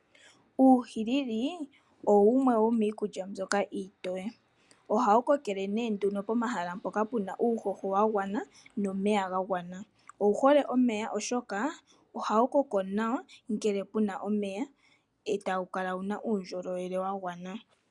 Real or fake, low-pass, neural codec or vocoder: real; 10.8 kHz; none